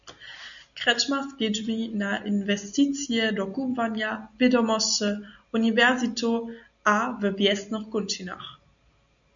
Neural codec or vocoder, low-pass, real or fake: none; 7.2 kHz; real